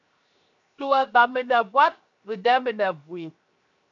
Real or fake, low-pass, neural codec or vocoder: fake; 7.2 kHz; codec, 16 kHz, 0.7 kbps, FocalCodec